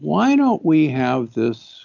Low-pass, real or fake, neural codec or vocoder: 7.2 kHz; real; none